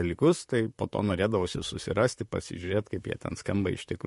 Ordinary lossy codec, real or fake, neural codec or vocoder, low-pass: MP3, 48 kbps; fake; vocoder, 44.1 kHz, 128 mel bands, Pupu-Vocoder; 14.4 kHz